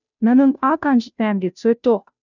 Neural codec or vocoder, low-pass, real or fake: codec, 16 kHz, 0.5 kbps, FunCodec, trained on Chinese and English, 25 frames a second; 7.2 kHz; fake